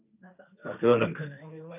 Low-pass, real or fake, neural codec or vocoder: 3.6 kHz; fake; codec, 16 kHz, 1.1 kbps, Voila-Tokenizer